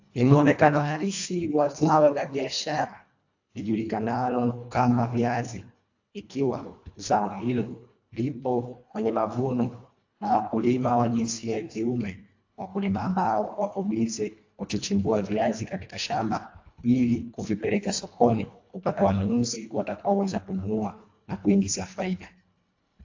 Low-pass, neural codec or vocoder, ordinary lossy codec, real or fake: 7.2 kHz; codec, 24 kHz, 1.5 kbps, HILCodec; AAC, 48 kbps; fake